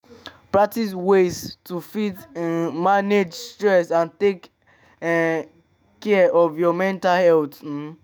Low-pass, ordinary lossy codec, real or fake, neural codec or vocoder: none; none; fake; autoencoder, 48 kHz, 128 numbers a frame, DAC-VAE, trained on Japanese speech